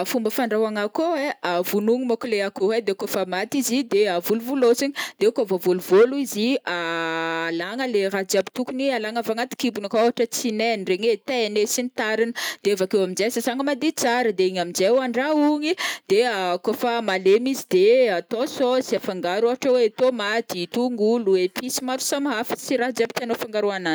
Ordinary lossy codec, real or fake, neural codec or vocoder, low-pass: none; real; none; none